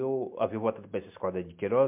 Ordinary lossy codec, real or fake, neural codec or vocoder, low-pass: MP3, 32 kbps; real; none; 3.6 kHz